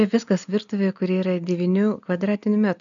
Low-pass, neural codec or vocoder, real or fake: 7.2 kHz; none; real